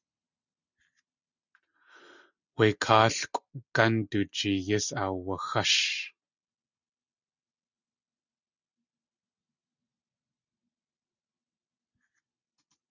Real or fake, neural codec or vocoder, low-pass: real; none; 7.2 kHz